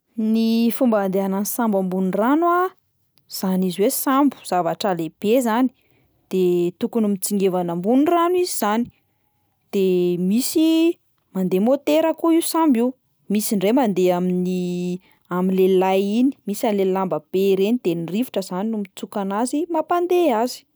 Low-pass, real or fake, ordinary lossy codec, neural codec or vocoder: none; real; none; none